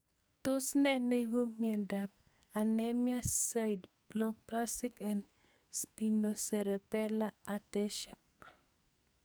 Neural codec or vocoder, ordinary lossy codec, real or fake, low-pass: codec, 44.1 kHz, 2.6 kbps, SNAC; none; fake; none